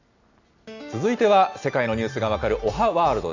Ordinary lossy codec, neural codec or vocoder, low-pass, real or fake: AAC, 48 kbps; none; 7.2 kHz; real